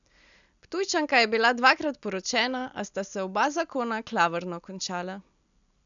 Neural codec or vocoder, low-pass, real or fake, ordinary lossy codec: none; 7.2 kHz; real; none